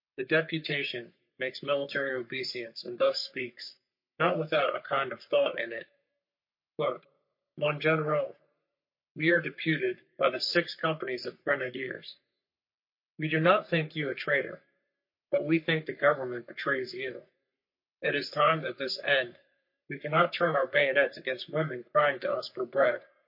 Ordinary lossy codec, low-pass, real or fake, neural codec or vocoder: MP3, 32 kbps; 5.4 kHz; fake; codec, 44.1 kHz, 3.4 kbps, Pupu-Codec